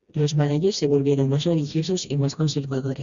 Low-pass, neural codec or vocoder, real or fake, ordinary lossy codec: 7.2 kHz; codec, 16 kHz, 1 kbps, FreqCodec, smaller model; fake; Opus, 64 kbps